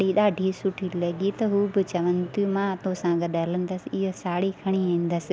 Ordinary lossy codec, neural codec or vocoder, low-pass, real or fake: none; none; none; real